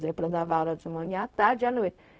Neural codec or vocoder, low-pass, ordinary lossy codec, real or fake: codec, 16 kHz, 0.4 kbps, LongCat-Audio-Codec; none; none; fake